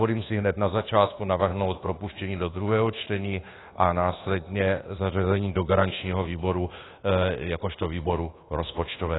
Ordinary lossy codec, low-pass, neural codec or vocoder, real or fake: AAC, 16 kbps; 7.2 kHz; codec, 24 kHz, 1.2 kbps, DualCodec; fake